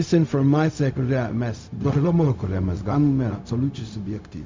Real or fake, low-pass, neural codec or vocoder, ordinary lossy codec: fake; 7.2 kHz; codec, 16 kHz, 0.4 kbps, LongCat-Audio-Codec; MP3, 64 kbps